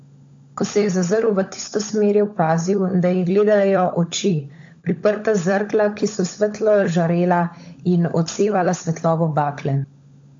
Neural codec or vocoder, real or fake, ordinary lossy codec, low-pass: codec, 16 kHz, 16 kbps, FunCodec, trained on LibriTTS, 50 frames a second; fake; AAC, 48 kbps; 7.2 kHz